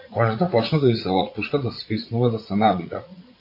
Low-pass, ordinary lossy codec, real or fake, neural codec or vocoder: 5.4 kHz; AAC, 32 kbps; fake; vocoder, 44.1 kHz, 80 mel bands, Vocos